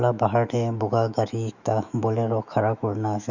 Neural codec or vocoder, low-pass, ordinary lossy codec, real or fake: autoencoder, 48 kHz, 128 numbers a frame, DAC-VAE, trained on Japanese speech; 7.2 kHz; none; fake